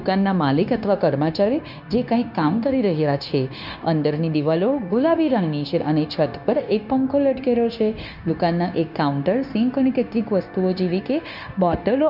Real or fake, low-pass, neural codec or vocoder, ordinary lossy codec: fake; 5.4 kHz; codec, 16 kHz, 0.9 kbps, LongCat-Audio-Codec; none